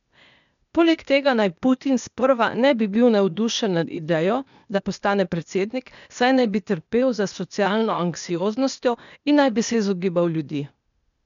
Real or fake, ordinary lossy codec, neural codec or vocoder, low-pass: fake; none; codec, 16 kHz, 0.8 kbps, ZipCodec; 7.2 kHz